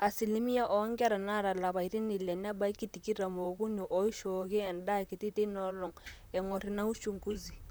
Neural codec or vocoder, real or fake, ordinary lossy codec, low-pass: vocoder, 44.1 kHz, 128 mel bands, Pupu-Vocoder; fake; none; none